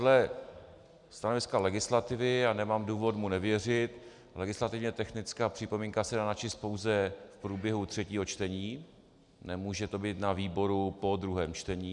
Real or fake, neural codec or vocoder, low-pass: real; none; 10.8 kHz